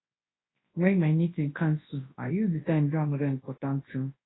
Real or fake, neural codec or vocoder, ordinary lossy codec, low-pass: fake; codec, 24 kHz, 0.9 kbps, WavTokenizer, large speech release; AAC, 16 kbps; 7.2 kHz